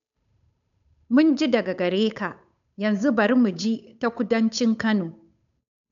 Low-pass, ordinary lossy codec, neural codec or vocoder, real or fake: 7.2 kHz; MP3, 96 kbps; codec, 16 kHz, 8 kbps, FunCodec, trained on Chinese and English, 25 frames a second; fake